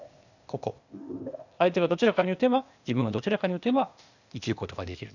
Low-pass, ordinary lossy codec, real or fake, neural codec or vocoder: 7.2 kHz; none; fake; codec, 16 kHz, 0.8 kbps, ZipCodec